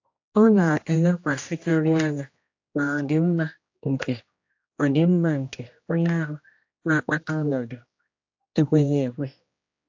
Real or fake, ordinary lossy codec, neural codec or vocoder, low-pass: fake; AAC, 48 kbps; codec, 16 kHz, 1 kbps, X-Codec, HuBERT features, trained on general audio; 7.2 kHz